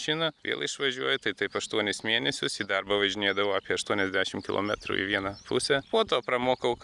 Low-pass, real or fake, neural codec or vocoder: 10.8 kHz; real; none